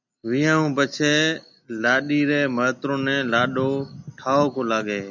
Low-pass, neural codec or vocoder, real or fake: 7.2 kHz; none; real